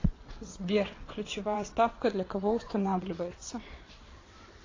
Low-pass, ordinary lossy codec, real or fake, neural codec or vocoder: 7.2 kHz; AAC, 32 kbps; fake; vocoder, 22.05 kHz, 80 mel bands, WaveNeXt